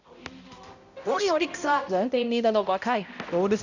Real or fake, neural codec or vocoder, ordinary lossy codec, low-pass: fake; codec, 16 kHz, 0.5 kbps, X-Codec, HuBERT features, trained on balanced general audio; none; 7.2 kHz